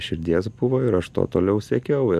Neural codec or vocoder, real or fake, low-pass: none; real; 14.4 kHz